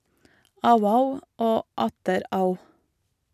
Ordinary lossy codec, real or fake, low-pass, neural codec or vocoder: none; real; 14.4 kHz; none